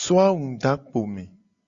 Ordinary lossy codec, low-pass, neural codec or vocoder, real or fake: Opus, 64 kbps; 7.2 kHz; none; real